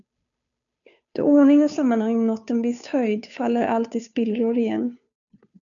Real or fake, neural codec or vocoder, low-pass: fake; codec, 16 kHz, 2 kbps, FunCodec, trained on Chinese and English, 25 frames a second; 7.2 kHz